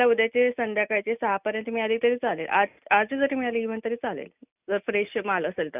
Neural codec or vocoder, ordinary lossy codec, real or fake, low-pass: none; none; real; 3.6 kHz